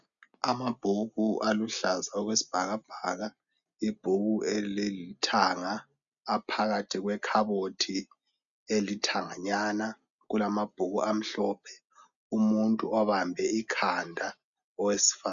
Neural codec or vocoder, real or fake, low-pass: none; real; 7.2 kHz